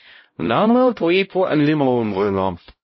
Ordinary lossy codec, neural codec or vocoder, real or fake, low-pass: MP3, 24 kbps; codec, 16 kHz, 0.5 kbps, X-Codec, HuBERT features, trained on LibriSpeech; fake; 7.2 kHz